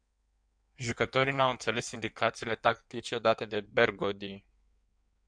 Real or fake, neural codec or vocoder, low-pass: fake; codec, 16 kHz in and 24 kHz out, 1.1 kbps, FireRedTTS-2 codec; 9.9 kHz